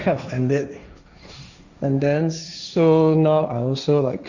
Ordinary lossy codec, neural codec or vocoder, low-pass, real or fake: Opus, 64 kbps; codec, 16 kHz, 1.1 kbps, Voila-Tokenizer; 7.2 kHz; fake